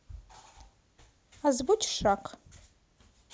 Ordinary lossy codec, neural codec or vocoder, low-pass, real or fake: none; none; none; real